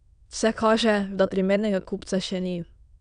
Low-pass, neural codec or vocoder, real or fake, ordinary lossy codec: 9.9 kHz; autoencoder, 22.05 kHz, a latent of 192 numbers a frame, VITS, trained on many speakers; fake; none